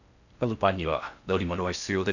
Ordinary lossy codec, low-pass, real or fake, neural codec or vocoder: none; 7.2 kHz; fake; codec, 16 kHz in and 24 kHz out, 0.6 kbps, FocalCodec, streaming, 4096 codes